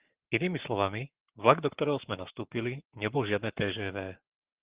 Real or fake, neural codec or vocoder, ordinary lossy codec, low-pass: fake; codec, 44.1 kHz, 7.8 kbps, Pupu-Codec; Opus, 16 kbps; 3.6 kHz